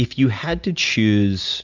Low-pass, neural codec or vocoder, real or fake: 7.2 kHz; none; real